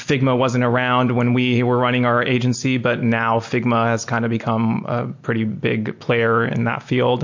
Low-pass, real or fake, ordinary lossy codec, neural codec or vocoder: 7.2 kHz; real; MP3, 48 kbps; none